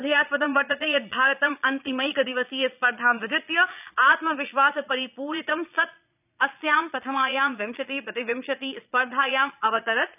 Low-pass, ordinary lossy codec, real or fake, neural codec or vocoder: 3.6 kHz; none; fake; vocoder, 22.05 kHz, 80 mel bands, Vocos